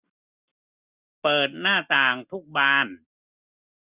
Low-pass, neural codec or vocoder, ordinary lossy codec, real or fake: 3.6 kHz; none; Opus, 64 kbps; real